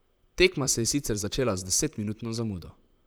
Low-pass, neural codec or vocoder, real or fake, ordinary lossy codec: none; vocoder, 44.1 kHz, 128 mel bands, Pupu-Vocoder; fake; none